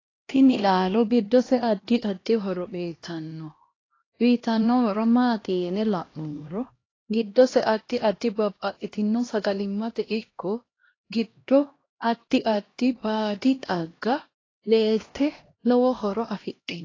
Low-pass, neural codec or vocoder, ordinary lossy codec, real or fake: 7.2 kHz; codec, 16 kHz, 1 kbps, X-Codec, HuBERT features, trained on LibriSpeech; AAC, 32 kbps; fake